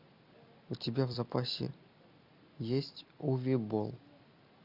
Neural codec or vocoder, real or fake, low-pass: none; real; 5.4 kHz